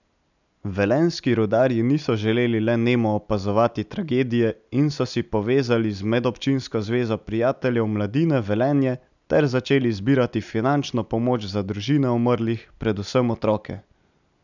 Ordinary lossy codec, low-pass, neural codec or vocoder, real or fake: none; 7.2 kHz; none; real